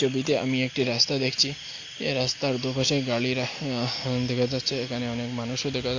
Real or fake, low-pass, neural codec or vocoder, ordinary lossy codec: real; 7.2 kHz; none; AAC, 48 kbps